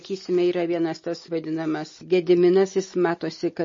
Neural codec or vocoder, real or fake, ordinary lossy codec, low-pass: none; real; MP3, 32 kbps; 7.2 kHz